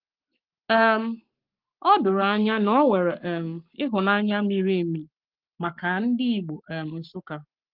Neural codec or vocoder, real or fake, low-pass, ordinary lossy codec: codec, 44.1 kHz, 7.8 kbps, Pupu-Codec; fake; 5.4 kHz; Opus, 32 kbps